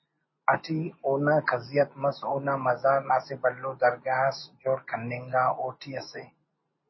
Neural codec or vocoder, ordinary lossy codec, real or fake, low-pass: none; MP3, 24 kbps; real; 7.2 kHz